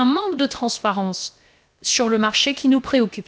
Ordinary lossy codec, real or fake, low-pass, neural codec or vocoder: none; fake; none; codec, 16 kHz, 0.7 kbps, FocalCodec